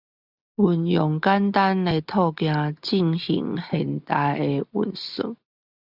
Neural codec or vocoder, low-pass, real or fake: none; 5.4 kHz; real